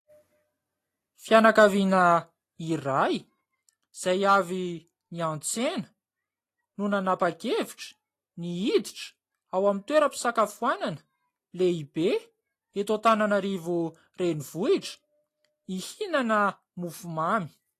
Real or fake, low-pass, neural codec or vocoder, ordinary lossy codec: real; 14.4 kHz; none; AAC, 48 kbps